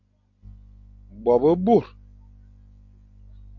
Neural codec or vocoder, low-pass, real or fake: none; 7.2 kHz; real